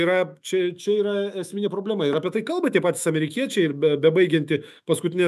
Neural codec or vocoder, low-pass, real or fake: autoencoder, 48 kHz, 128 numbers a frame, DAC-VAE, trained on Japanese speech; 14.4 kHz; fake